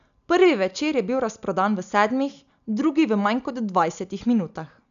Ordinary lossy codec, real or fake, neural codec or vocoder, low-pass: none; real; none; 7.2 kHz